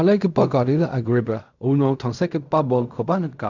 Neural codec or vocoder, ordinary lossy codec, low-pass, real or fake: codec, 16 kHz in and 24 kHz out, 0.4 kbps, LongCat-Audio-Codec, fine tuned four codebook decoder; none; 7.2 kHz; fake